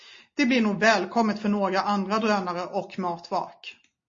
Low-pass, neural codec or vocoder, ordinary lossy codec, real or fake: 7.2 kHz; none; MP3, 32 kbps; real